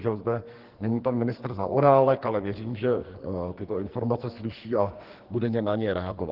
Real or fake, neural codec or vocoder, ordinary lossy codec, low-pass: fake; codec, 44.1 kHz, 2.6 kbps, SNAC; Opus, 16 kbps; 5.4 kHz